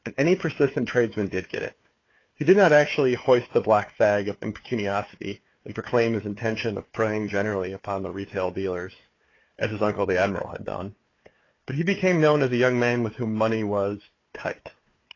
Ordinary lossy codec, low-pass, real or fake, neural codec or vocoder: AAC, 32 kbps; 7.2 kHz; fake; codec, 16 kHz, 4 kbps, FunCodec, trained on Chinese and English, 50 frames a second